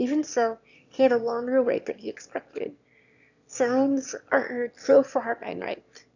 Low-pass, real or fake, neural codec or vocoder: 7.2 kHz; fake; autoencoder, 22.05 kHz, a latent of 192 numbers a frame, VITS, trained on one speaker